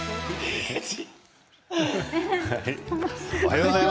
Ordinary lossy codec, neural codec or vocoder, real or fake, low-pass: none; none; real; none